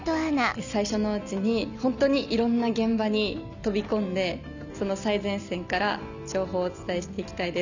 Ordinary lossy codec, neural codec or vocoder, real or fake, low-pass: none; none; real; 7.2 kHz